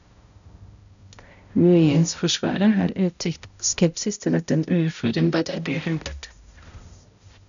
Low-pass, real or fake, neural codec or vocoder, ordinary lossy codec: 7.2 kHz; fake; codec, 16 kHz, 0.5 kbps, X-Codec, HuBERT features, trained on balanced general audio; none